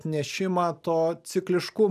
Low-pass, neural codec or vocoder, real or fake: 14.4 kHz; none; real